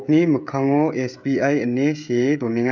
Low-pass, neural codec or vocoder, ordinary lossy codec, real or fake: 7.2 kHz; codec, 16 kHz, 16 kbps, FreqCodec, smaller model; none; fake